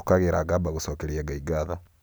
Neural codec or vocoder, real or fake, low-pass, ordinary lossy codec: none; real; none; none